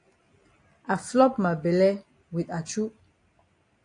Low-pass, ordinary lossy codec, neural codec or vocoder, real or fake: 9.9 kHz; AAC, 48 kbps; none; real